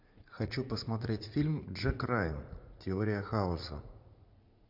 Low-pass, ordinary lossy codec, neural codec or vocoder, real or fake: 5.4 kHz; MP3, 48 kbps; vocoder, 44.1 kHz, 80 mel bands, Vocos; fake